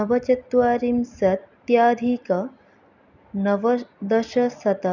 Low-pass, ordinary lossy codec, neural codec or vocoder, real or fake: 7.2 kHz; none; none; real